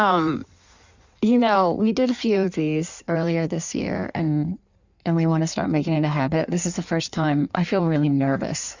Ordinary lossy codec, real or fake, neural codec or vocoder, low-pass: Opus, 64 kbps; fake; codec, 16 kHz in and 24 kHz out, 1.1 kbps, FireRedTTS-2 codec; 7.2 kHz